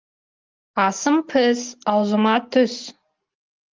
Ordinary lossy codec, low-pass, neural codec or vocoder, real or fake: Opus, 32 kbps; 7.2 kHz; none; real